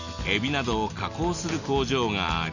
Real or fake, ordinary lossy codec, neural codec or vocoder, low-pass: real; none; none; 7.2 kHz